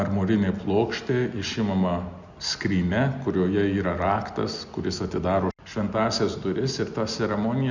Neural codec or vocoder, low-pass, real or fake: none; 7.2 kHz; real